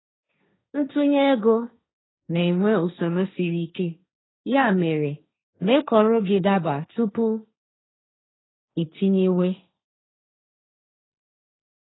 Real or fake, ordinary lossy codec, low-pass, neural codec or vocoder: fake; AAC, 16 kbps; 7.2 kHz; codec, 16 kHz, 1.1 kbps, Voila-Tokenizer